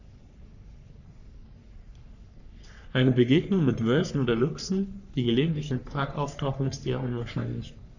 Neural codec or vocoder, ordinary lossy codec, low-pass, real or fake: codec, 44.1 kHz, 3.4 kbps, Pupu-Codec; none; 7.2 kHz; fake